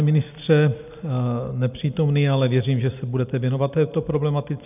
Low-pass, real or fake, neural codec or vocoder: 3.6 kHz; real; none